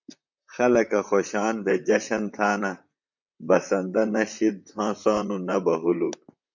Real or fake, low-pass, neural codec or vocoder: fake; 7.2 kHz; vocoder, 44.1 kHz, 128 mel bands, Pupu-Vocoder